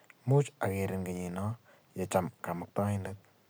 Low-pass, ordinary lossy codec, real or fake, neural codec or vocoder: none; none; real; none